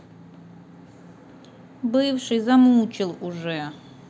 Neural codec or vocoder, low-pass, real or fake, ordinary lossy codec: none; none; real; none